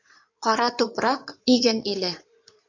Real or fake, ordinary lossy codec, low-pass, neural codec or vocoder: fake; AAC, 48 kbps; 7.2 kHz; codec, 16 kHz in and 24 kHz out, 2.2 kbps, FireRedTTS-2 codec